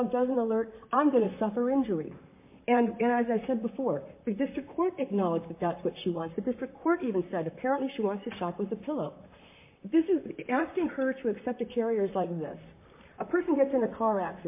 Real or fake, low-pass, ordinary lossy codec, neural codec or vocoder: fake; 3.6 kHz; MP3, 24 kbps; vocoder, 44.1 kHz, 128 mel bands, Pupu-Vocoder